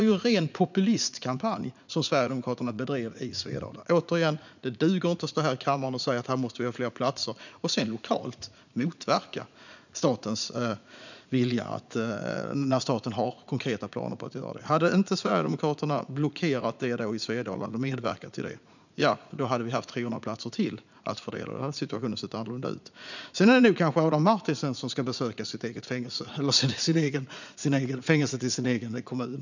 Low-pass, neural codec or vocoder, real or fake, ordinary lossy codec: 7.2 kHz; vocoder, 44.1 kHz, 80 mel bands, Vocos; fake; none